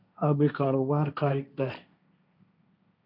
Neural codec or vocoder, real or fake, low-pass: codec, 16 kHz, 1.1 kbps, Voila-Tokenizer; fake; 5.4 kHz